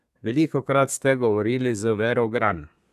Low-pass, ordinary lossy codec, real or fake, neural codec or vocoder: 14.4 kHz; none; fake; codec, 32 kHz, 1.9 kbps, SNAC